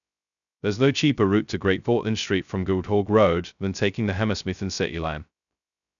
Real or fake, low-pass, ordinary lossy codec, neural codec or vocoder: fake; 7.2 kHz; none; codec, 16 kHz, 0.2 kbps, FocalCodec